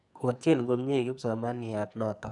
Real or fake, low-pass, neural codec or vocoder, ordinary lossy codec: fake; 10.8 kHz; codec, 44.1 kHz, 2.6 kbps, SNAC; none